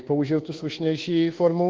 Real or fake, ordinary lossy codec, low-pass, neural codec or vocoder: fake; Opus, 16 kbps; 7.2 kHz; codec, 24 kHz, 1.2 kbps, DualCodec